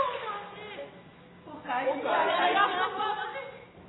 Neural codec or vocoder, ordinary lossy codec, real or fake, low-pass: none; AAC, 16 kbps; real; 7.2 kHz